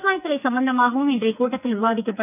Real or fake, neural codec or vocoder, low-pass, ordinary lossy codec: fake; codec, 44.1 kHz, 2.6 kbps, SNAC; 3.6 kHz; none